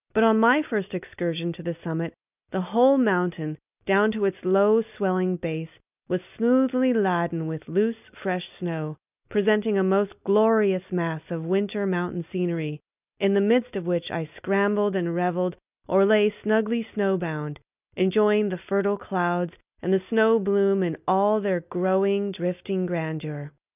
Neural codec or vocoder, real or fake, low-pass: none; real; 3.6 kHz